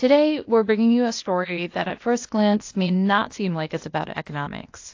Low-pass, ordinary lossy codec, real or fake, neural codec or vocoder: 7.2 kHz; AAC, 48 kbps; fake; codec, 16 kHz, 0.8 kbps, ZipCodec